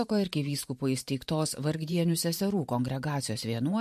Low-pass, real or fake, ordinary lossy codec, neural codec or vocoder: 14.4 kHz; fake; MP3, 64 kbps; vocoder, 44.1 kHz, 128 mel bands every 512 samples, BigVGAN v2